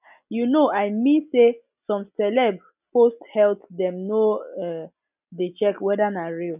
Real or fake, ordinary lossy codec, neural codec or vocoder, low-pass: real; none; none; 3.6 kHz